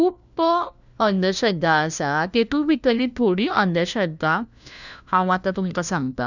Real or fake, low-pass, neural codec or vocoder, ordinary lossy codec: fake; 7.2 kHz; codec, 16 kHz, 1 kbps, FunCodec, trained on LibriTTS, 50 frames a second; none